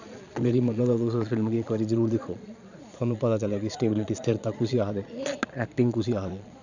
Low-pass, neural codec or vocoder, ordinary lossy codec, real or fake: 7.2 kHz; vocoder, 22.05 kHz, 80 mel bands, Vocos; none; fake